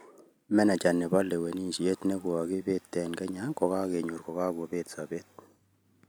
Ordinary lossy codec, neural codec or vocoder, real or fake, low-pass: none; none; real; none